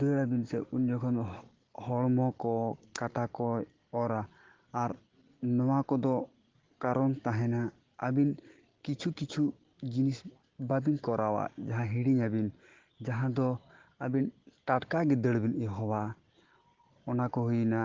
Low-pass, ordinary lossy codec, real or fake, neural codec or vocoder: 7.2 kHz; Opus, 16 kbps; real; none